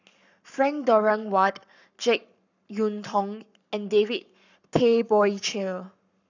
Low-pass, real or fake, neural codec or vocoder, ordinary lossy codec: 7.2 kHz; fake; codec, 44.1 kHz, 7.8 kbps, Pupu-Codec; none